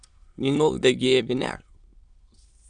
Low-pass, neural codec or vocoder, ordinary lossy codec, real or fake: 9.9 kHz; autoencoder, 22.05 kHz, a latent of 192 numbers a frame, VITS, trained on many speakers; Opus, 64 kbps; fake